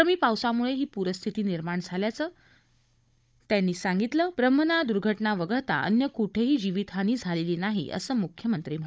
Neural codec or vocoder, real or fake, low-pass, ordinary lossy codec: codec, 16 kHz, 16 kbps, FunCodec, trained on Chinese and English, 50 frames a second; fake; none; none